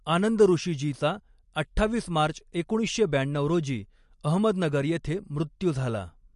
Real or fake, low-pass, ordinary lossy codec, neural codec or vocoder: real; 14.4 kHz; MP3, 48 kbps; none